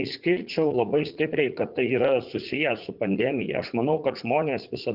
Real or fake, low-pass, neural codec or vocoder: fake; 5.4 kHz; vocoder, 44.1 kHz, 80 mel bands, Vocos